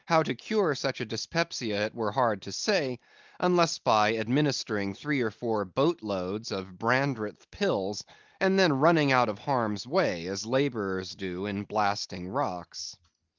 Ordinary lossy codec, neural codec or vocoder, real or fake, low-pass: Opus, 32 kbps; none; real; 7.2 kHz